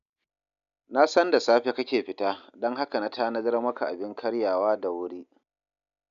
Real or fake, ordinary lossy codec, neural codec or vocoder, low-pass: real; none; none; 7.2 kHz